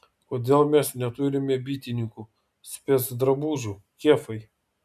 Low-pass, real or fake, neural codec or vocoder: 14.4 kHz; real; none